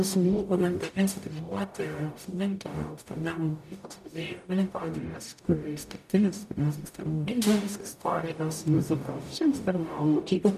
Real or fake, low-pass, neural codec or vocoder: fake; 14.4 kHz; codec, 44.1 kHz, 0.9 kbps, DAC